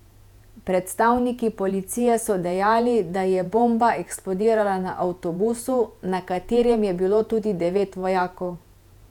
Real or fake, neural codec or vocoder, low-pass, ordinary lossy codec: fake; vocoder, 48 kHz, 128 mel bands, Vocos; 19.8 kHz; none